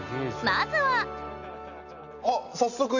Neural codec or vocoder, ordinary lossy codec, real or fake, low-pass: none; none; real; 7.2 kHz